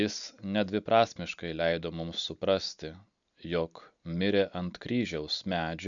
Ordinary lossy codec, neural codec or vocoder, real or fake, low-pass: MP3, 96 kbps; none; real; 7.2 kHz